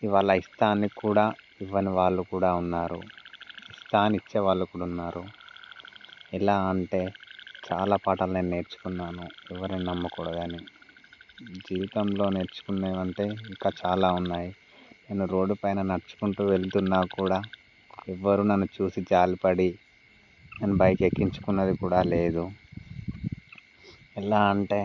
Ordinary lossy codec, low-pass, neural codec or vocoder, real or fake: none; 7.2 kHz; none; real